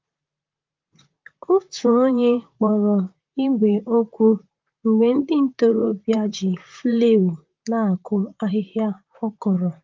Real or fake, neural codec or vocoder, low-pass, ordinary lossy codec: fake; vocoder, 44.1 kHz, 128 mel bands, Pupu-Vocoder; 7.2 kHz; Opus, 24 kbps